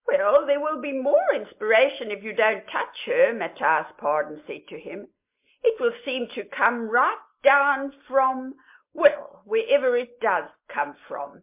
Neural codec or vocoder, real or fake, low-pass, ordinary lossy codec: none; real; 3.6 kHz; MP3, 32 kbps